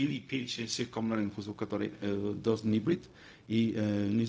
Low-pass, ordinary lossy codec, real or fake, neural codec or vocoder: none; none; fake; codec, 16 kHz, 0.4 kbps, LongCat-Audio-Codec